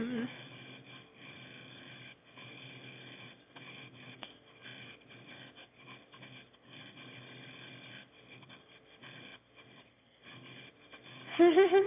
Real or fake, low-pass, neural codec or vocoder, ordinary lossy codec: fake; 3.6 kHz; autoencoder, 22.05 kHz, a latent of 192 numbers a frame, VITS, trained on one speaker; AAC, 24 kbps